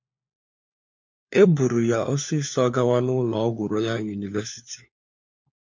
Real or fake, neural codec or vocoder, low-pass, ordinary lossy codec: fake; codec, 16 kHz, 4 kbps, FunCodec, trained on LibriTTS, 50 frames a second; 7.2 kHz; MP3, 48 kbps